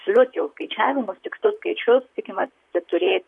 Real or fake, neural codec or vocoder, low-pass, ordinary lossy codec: fake; vocoder, 44.1 kHz, 128 mel bands, Pupu-Vocoder; 10.8 kHz; MP3, 64 kbps